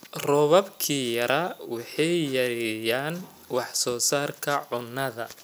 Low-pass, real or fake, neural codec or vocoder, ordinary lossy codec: none; real; none; none